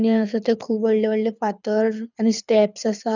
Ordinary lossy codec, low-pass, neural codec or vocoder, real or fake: none; 7.2 kHz; codec, 24 kHz, 6 kbps, HILCodec; fake